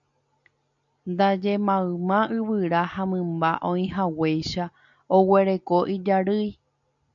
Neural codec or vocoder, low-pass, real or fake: none; 7.2 kHz; real